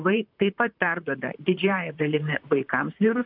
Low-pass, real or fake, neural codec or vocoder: 5.4 kHz; fake; vocoder, 44.1 kHz, 128 mel bands, Pupu-Vocoder